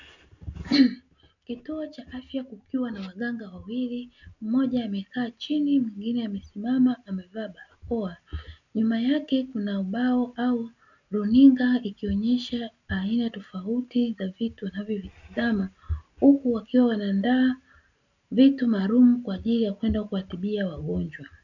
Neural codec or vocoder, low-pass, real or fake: none; 7.2 kHz; real